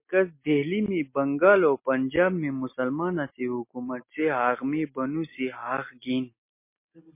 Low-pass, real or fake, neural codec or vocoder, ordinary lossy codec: 3.6 kHz; real; none; MP3, 24 kbps